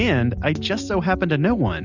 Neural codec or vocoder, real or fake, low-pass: none; real; 7.2 kHz